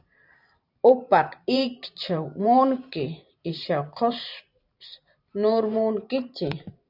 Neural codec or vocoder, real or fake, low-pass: vocoder, 44.1 kHz, 128 mel bands every 512 samples, BigVGAN v2; fake; 5.4 kHz